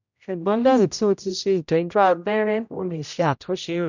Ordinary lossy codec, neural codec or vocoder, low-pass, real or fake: none; codec, 16 kHz, 0.5 kbps, X-Codec, HuBERT features, trained on general audio; 7.2 kHz; fake